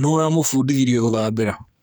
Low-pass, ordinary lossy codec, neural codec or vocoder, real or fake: none; none; codec, 44.1 kHz, 2.6 kbps, SNAC; fake